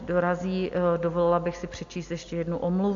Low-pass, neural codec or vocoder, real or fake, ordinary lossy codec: 7.2 kHz; none; real; AAC, 48 kbps